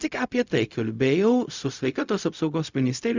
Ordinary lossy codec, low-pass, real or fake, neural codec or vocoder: Opus, 64 kbps; 7.2 kHz; fake; codec, 16 kHz, 0.4 kbps, LongCat-Audio-Codec